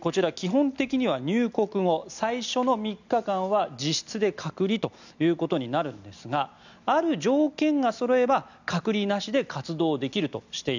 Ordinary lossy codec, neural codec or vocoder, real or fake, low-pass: none; none; real; 7.2 kHz